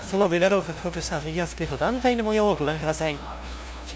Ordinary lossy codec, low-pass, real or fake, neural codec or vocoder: none; none; fake; codec, 16 kHz, 0.5 kbps, FunCodec, trained on LibriTTS, 25 frames a second